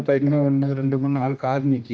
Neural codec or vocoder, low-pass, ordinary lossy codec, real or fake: codec, 16 kHz, 1 kbps, X-Codec, HuBERT features, trained on general audio; none; none; fake